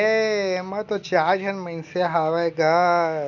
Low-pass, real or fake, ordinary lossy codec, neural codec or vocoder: 7.2 kHz; real; none; none